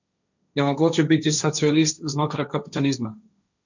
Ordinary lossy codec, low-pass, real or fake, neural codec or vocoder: none; 7.2 kHz; fake; codec, 16 kHz, 1.1 kbps, Voila-Tokenizer